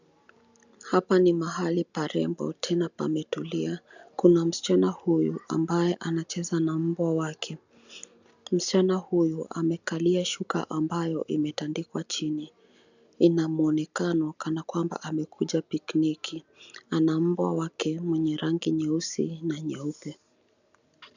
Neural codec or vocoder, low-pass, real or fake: none; 7.2 kHz; real